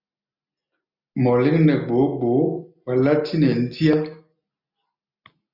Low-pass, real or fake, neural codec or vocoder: 5.4 kHz; real; none